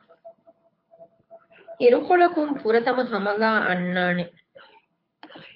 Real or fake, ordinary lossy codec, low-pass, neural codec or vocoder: fake; MP3, 32 kbps; 5.4 kHz; codec, 24 kHz, 6 kbps, HILCodec